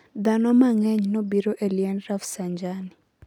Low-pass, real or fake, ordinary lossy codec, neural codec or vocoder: 19.8 kHz; real; none; none